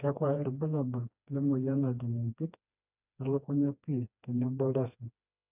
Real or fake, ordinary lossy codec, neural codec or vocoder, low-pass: fake; none; codec, 16 kHz, 2 kbps, FreqCodec, smaller model; 3.6 kHz